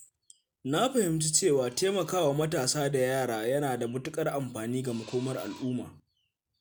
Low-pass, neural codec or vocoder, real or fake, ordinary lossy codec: none; none; real; none